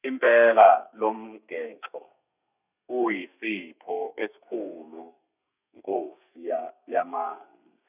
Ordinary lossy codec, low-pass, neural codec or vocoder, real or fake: none; 3.6 kHz; codec, 32 kHz, 1.9 kbps, SNAC; fake